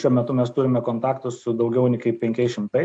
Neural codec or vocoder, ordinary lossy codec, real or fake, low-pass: none; AAC, 64 kbps; real; 9.9 kHz